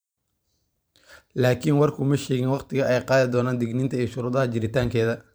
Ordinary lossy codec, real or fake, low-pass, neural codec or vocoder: none; fake; none; vocoder, 44.1 kHz, 128 mel bands every 512 samples, BigVGAN v2